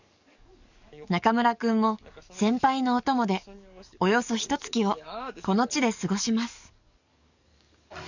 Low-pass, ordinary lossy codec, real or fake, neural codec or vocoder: 7.2 kHz; none; fake; codec, 44.1 kHz, 7.8 kbps, DAC